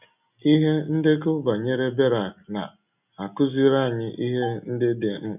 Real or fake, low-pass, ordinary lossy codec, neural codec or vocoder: real; 3.6 kHz; none; none